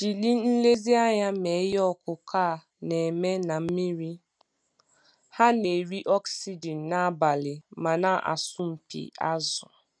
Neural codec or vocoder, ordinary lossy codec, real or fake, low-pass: none; none; real; 9.9 kHz